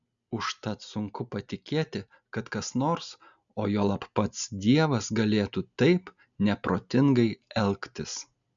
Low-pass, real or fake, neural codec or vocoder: 7.2 kHz; real; none